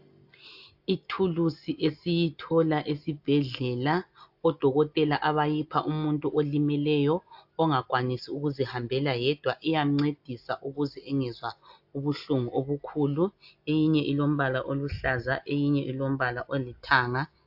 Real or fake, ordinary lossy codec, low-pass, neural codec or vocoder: real; AAC, 48 kbps; 5.4 kHz; none